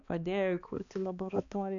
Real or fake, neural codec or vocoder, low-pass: fake; codec, 16 kHz, 2 kbps, X-Codec, HuBERT features, trained on balanced general audio; 7.2 kHz